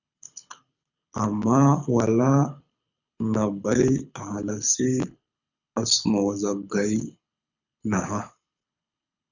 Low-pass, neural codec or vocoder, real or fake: 7.2 kHz; codec, 24 kHz, 6 kbps, HILCodec; fake